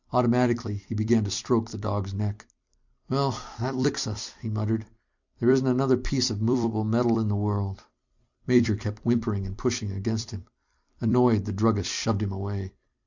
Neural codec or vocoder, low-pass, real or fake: vocoder, 44.1 kHz, 128 mel bands every 256 samples, BigVGAN v2; 7.2 kHz; fake